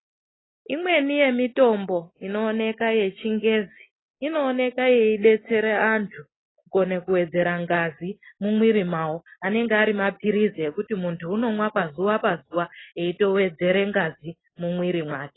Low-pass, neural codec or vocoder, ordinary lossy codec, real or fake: 7.2 kHz; none; AAC, 16 kbps; real